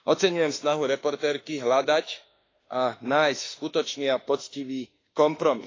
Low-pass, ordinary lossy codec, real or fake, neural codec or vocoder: 7.2 kHz; AAC, 32 kbps; fake; autoencoder, 48 kHz, 32 numbers a frame, DAC-VAE, trained on Japanese speech